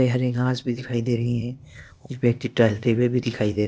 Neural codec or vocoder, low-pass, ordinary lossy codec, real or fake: codec, 16 kHz, 0.8 kbps, ZipCodec; none; none; fake